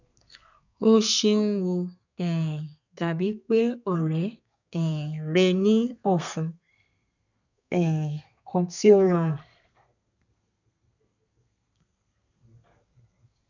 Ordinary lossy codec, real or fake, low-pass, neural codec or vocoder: none; fake; 7.2 kHz; codec, 32 kHz, 1.9 kbps, SNAC